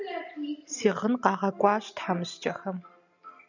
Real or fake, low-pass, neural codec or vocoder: real; 7.2 kHz; none